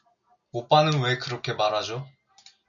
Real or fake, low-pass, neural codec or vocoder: real; 7.2 kHz; none